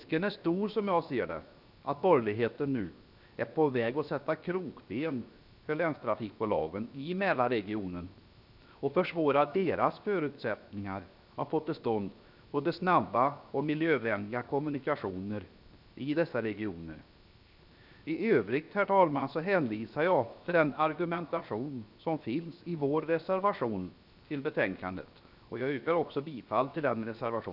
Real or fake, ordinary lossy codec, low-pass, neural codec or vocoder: fake; none; 5.4 kHz; codec, 16 kHz, 0.7 kbps, FocalCodec